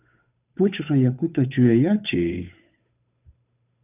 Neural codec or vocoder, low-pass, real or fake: codec, 16 kHz, 8 kbps, FunCodec, trained on Chinese and English, 25 frames a second; 3.6 kHz; fake